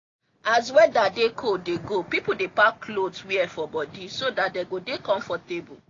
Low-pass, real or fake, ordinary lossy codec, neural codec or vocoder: 7.2 kHz; real; AAC, 32 kbps; none